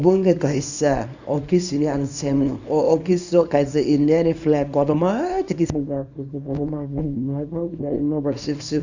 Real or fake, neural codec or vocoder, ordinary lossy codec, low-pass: fake; codec, 24 kHz, 0.9 kbps, WavTokenizer, small release; none; 7.2 kHz